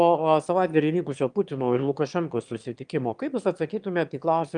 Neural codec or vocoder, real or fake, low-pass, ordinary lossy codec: autoencoder, 22.05 kHz, a latent of 192 numbers a frame, VITS, trained on one speaker; fake; 9.9 kHz; Opus, 32 kbps